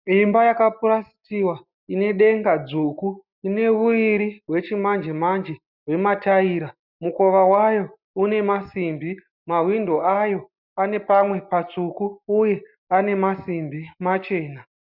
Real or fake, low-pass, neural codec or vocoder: real; 5.4 kHz; none